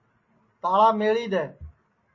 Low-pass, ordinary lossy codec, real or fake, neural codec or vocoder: 7.2 kHz; MP3, 32 kbps; real; none